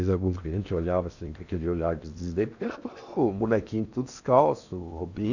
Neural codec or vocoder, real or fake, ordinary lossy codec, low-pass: codec, 16 kHz in and 24 kHz out, 0.8 kbps, FocalCodec, streaming, 65536 codes; fake; none; 7.2 kHz